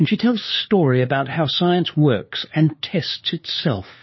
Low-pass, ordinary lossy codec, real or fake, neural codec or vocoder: 7.2 kHz; MP3, 24 kbps; fake; codec, 16 kHz, 2 kbps, FunCodec, trained on LibriTTS, 25 frames a second